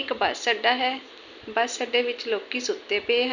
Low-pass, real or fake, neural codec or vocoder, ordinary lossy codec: 7.2 kHz; real; none; none